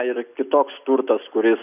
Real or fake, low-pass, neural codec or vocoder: real; 3.6 kHz; none